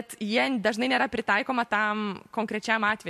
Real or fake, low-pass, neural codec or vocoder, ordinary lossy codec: real; 14.4 kHz; none; MP3, 64 kbps